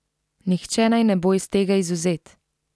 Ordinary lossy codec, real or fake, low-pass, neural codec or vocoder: none; real; none; none